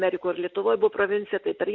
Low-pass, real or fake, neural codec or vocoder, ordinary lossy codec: 7.2 kHz; real; none; MP3, 48 kbps